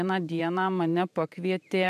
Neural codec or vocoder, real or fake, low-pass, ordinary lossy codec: vocoder, 44.1 kHz, 128 mel bands every 512 samples, BigVGAN v2; fake; 14.4 kHz; AAC, 96 kbps